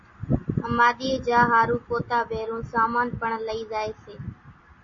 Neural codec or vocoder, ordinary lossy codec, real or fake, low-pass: none; MP3, 32 kbps; real; 7.2 kHz